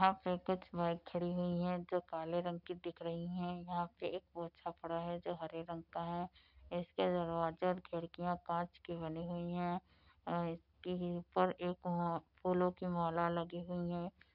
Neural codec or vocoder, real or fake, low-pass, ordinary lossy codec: none; real; 5.4 kHz; none